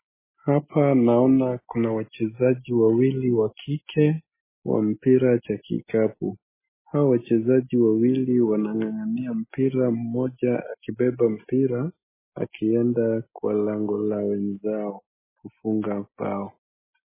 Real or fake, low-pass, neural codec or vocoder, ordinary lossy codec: real; 3.6 kHz; none; MP3, 16 kbps